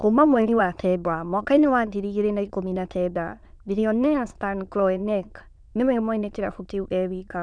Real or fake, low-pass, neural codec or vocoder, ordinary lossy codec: fake; 9.9 kHz; autoencoder, 22.05 kHz, a latent of 192 numbers a frame, VITS, trained on many speakers; none